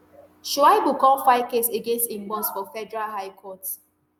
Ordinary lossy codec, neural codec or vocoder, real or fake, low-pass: none; none; real; none